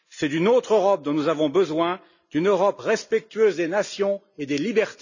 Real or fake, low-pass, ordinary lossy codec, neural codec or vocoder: real; 7.2 kHz; none; none